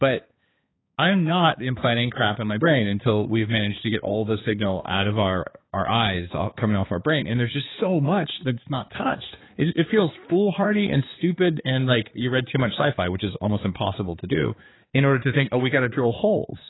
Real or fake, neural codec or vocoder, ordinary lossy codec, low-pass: fake; codec, 16 kHz, 4 kbps, X-Codec, HuBERT features, trained on balanced general audio; AAC, 16 kbps; 7.2 kHz